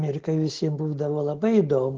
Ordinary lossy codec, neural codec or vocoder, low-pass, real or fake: Opus, 16 kbps; none; 7.2 kHz; real